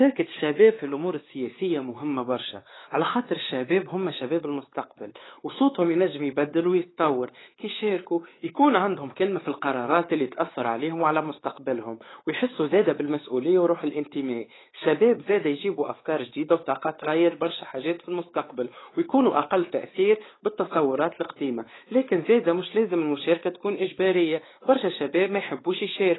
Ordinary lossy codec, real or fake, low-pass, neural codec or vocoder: AAC, 16 kbps; fake; 7.2 kHz; codec, 16 kHz, 4 kbps, X-Codec, WavLM features, trained on Multilingual LibriSpeech